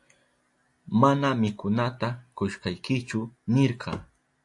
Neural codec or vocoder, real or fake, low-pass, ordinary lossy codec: none; real; 10.8 kHz; AAC, 64 kbps